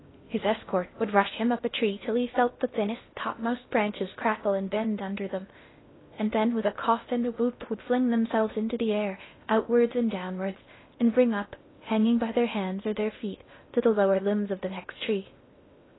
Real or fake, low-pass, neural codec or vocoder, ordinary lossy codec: fake; 7.2 kHz; codec, 16 kHz in and 24 kHz out, 0.6 kbps, FocalCodec, streaming, 4096 codes; AAC, 16 kbps